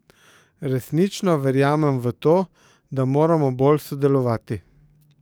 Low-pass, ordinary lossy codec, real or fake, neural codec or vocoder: none; none; fake; codec, 44.1 kHz, 7.8 kbps, DAC